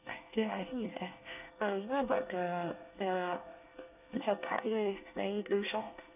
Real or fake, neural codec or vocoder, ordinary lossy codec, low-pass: fake; codec, 24 kHz, 1 kbps, SNAC; none; 3.6 kHz